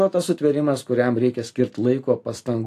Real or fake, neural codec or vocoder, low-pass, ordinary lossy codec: real; none; 14.4 kHz; AAC, 64 kbps